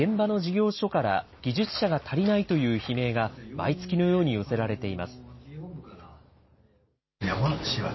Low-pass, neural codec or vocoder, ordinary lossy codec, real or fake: 7.2 kHz; none; MP3, 24 kbps; real